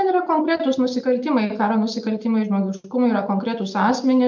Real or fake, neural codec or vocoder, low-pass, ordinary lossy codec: real; none; 7.2 kHz; MP3, 48 kbps